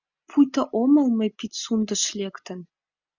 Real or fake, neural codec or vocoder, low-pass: real; none; 7.2 kHz